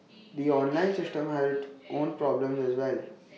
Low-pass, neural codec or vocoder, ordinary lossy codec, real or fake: none; none; none; real